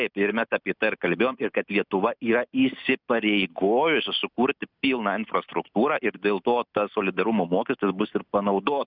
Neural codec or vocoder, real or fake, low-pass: none; real; 5.4 kHz